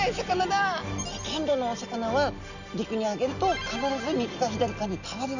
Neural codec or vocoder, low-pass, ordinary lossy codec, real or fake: none; 7.2 kHz; none; real